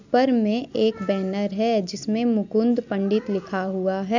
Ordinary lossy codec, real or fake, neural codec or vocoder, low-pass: none; real; none; 7.2 kHz